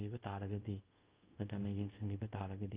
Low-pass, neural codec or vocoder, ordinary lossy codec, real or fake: 3.6 kHz; codec, 24 kHz, 0.5 kbps, DualCodec; Opus, 24 kbps; fake